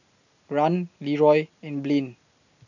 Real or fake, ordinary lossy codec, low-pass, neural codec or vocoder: real; none; 7.2 kHz; none